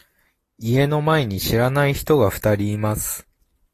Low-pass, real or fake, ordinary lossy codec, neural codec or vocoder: 14.4 kHz; real; AAC, 48 kbps; none